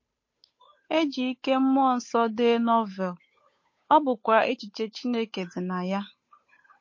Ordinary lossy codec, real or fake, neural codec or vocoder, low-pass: MP3, 32 kbps; fake; codec, 16 kHz, 8 kbps, FunCodec, trained on Chinese and English, 25 frames a second; 7.2 kHz